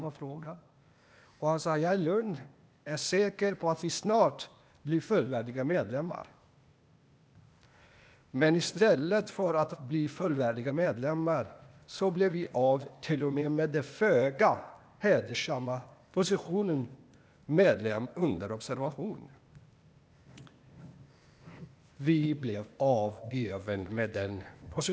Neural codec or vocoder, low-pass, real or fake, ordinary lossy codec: codec, 16 kHz, 0.8 kbps, ZipCodec; none; fake; none